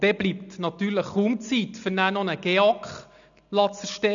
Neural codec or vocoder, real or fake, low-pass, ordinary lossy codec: none; real; 7.2 kHz; none